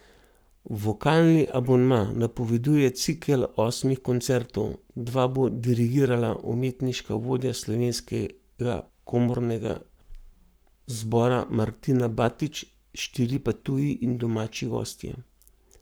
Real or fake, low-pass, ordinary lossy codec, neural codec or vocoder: fake; none; none; codec, 44.1 kHz, 7.8 kbps, Pupu-Codec